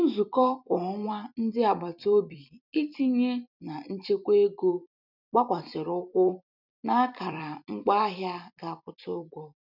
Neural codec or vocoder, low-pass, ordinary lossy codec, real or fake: vocoder, 44.1 kHz, 128 mel bands every 256 samples, BigVGAN v2; 5.4 kHz; none; fake